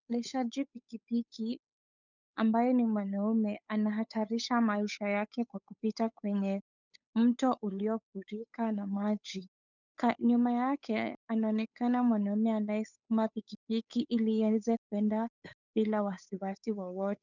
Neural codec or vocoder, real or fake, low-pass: codec, 16 kHz, 8 kbps, FunCodec, trained on Chinese and English, 25 frames a second; fake; 7.2 kHz